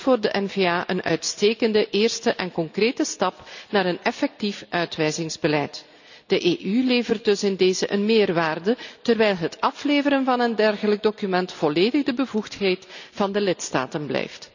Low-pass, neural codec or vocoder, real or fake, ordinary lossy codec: 7.2 kHz; none; real; none